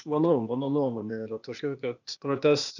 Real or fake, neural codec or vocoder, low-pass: fake; codec, 16 kHz, 0.8 kbps, ZipCodec; 7.2 kHz